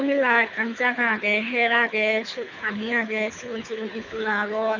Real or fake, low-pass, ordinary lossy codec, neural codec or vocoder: fake; 7.2 kHz; none; codec, 24 kHz, 6 kbps, HILCodec